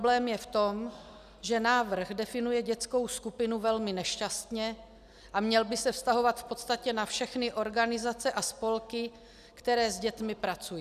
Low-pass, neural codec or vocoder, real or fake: 14.4 kHz; none; real